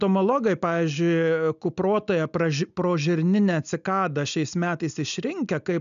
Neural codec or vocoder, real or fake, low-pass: none; real; 7.2 kHz